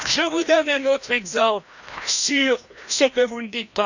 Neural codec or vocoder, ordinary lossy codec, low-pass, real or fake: codec, 16 kHz, 1 kbps, FreqCodec, larger model; none; 7.2 kHz; fake